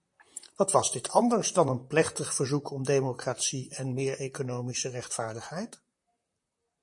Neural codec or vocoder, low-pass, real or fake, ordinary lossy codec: vocoder, 44.1 kHz, 128 mel bands, Pupu-Vocoder; 10.8 kHz; fake; MP3, 48 kbps